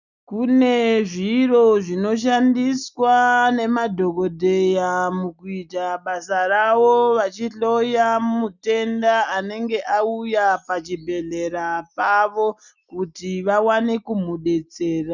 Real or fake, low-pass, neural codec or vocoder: real; 7.2 kHz; none